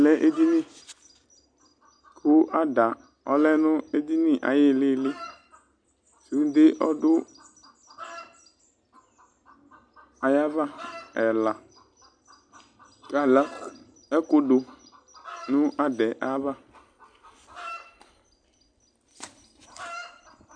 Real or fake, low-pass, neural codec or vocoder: real; 9.9 kHz; none